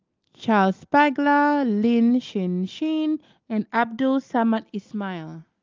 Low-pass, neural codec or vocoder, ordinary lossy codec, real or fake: 7.2 kHz; none; Opus, 32 kbps; real